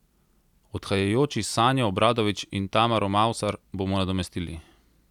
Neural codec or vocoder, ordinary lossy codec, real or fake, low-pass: none; none; real; 19.8 kHz